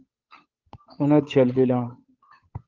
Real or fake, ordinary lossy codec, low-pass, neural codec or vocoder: fake; Opus, 32 kbps; 7.2 kHz; codec, 16 kHz, 8 kbps, FunCodec, trained on Chinese and English, 25 frames a second